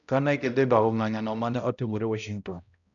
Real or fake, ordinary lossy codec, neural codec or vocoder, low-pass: fake; none; codec, 16 kHz, 0.5 kbps, X-Codec, HuBERT features, trained on balanced general audio; 7.2 kHz